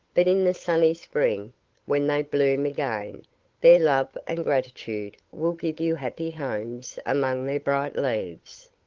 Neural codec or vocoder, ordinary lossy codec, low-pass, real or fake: codec, 16 kHz, 8 kbps, FunCodec, trained on Chinese and English, 25 frames a second; Opus, 16 kbps; 7.2 kHz; fake